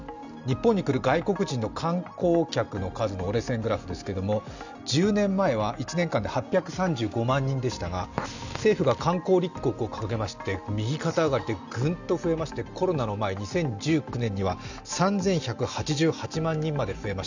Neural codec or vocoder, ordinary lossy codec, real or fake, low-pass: none; none; real; 7.2 kHz